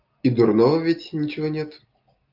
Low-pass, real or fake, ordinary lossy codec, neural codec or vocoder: 5.4 kHz; real; Opus, 24 kbps; none